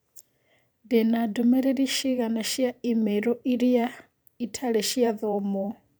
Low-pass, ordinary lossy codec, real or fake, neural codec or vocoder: none; none; fake; vocoder, 44.1 kHz, 128 mel bands every 512 samples, BigVGAN v2